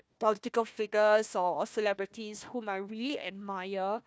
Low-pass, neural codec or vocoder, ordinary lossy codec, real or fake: none; codec, 16 kHz, 1 kbps, FunCodec, trained on Chinese and English, 50 frames a second; none; fake